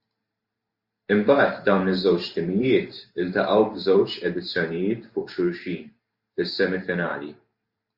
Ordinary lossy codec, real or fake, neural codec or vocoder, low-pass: Opus, 64 kbps; fake; vocoder, 44.1 kHz, 128 mel bands every 512 samples, BigVGAN v2; 5.4 kHz